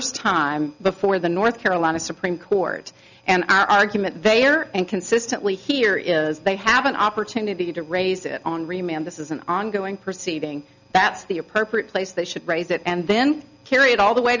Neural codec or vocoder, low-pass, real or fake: none; 7.2 kHz; real